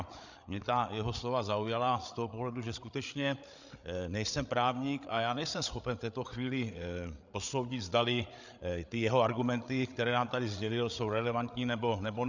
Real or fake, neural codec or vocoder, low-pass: fake; codec, 16 kHz, 8 kbps, FreqCodec, larger model; 7.2 kHz